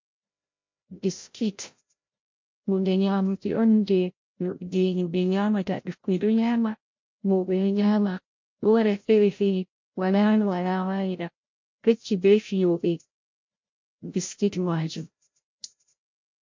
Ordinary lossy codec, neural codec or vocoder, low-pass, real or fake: MP3, 48 kbps; codec, 16 kHz, 0.5 kbps, FreqCodec, larger model; 7.2 kHz; fake